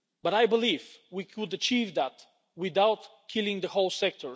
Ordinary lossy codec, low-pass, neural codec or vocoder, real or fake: none; none; none; real